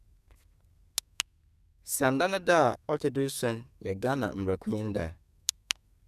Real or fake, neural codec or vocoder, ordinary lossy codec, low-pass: fake; codec, 32 kHz, 1.9 kbps, SNAC; none; 14.4 kHz